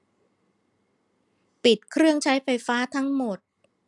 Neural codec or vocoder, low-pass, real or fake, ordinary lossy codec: none; 10.8 kHz; real; none